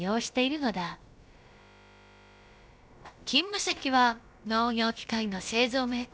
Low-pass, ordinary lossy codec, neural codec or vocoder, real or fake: none; none; codec, 16 kHz, about 1 kbps, DyCAST, with the encoder's durations; fake